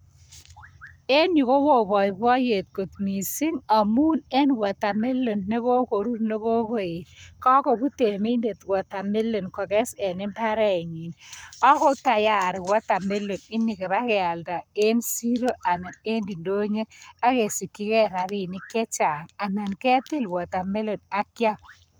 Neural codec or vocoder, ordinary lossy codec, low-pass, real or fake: codec, 44.1 kHz, 7.8 kbps, Pupu-Codec; none; none; fake